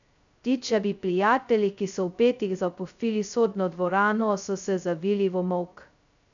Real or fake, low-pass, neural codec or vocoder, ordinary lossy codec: fake; 7.2 kHz; codec, 16 kHz, 0.2 kbps, FocalCodec; none